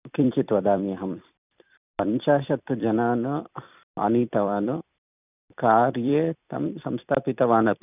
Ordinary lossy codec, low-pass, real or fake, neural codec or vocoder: none; 3.6 kHz; fake; vocoder, 44.1 kHz, 128 mel bands every 256 samples, BigVGAN v2